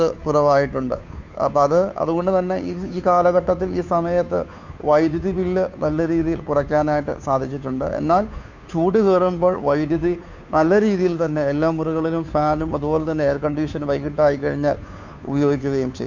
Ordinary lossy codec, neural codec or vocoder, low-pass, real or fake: none; codec, 16 kHz, 2 kbps, FunCodec, trained on Chinese and English, 25 frames a second; 7.2 kHz; fake